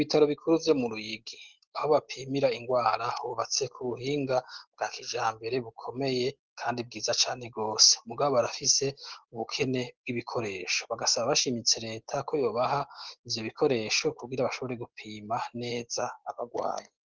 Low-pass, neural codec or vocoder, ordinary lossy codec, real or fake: 7.2 kHz; none; Opus, 16 kbps; real